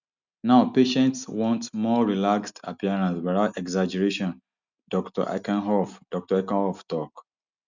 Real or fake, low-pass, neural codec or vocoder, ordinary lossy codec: real; 7.2 kHz; none; none